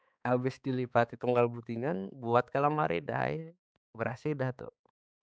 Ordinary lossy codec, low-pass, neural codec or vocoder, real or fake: none; none; codec, 16 kHz, 4 kbps, X-Codec, HuBERT features, trained on balanced general audio; fake